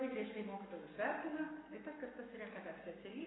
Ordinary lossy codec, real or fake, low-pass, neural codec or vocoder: AAC, 16 kbps; fake; 7.2 kHz; codec, 44.1 kHz, 7.8 kbps, Pupu-Codec